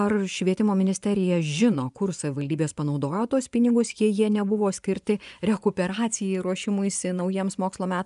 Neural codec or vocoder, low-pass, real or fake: vocoder, 24 kHz, 100 mel bands, Vocos; 10.8 kHz; fake